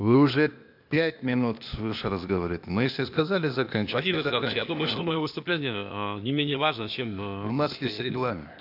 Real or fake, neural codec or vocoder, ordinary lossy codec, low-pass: fake; codec, 16 kHz, 0.8 kbps, ZipCodec; AAC, 48 kbps; 5.4 kHz